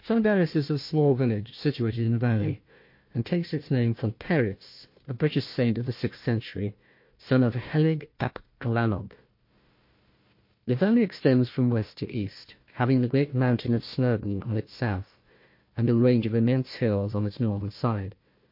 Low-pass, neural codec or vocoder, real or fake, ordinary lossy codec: 5.4 kHz; codec, 16 kHz, 1 kbps, FunCodec, trained on Chinese and English, 50 frames a second; fake; MP3, 32 kbps